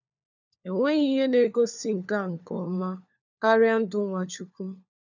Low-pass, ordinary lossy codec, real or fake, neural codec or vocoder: 7.2 kHz; none; fake; codec, 16 kHz, 4 kbps, FunCodec, trained on LibriTTS, 50 frames a second